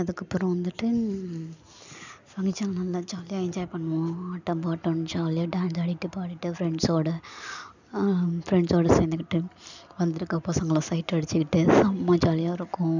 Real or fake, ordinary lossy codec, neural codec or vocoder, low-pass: real; none; none; 7.2 kHz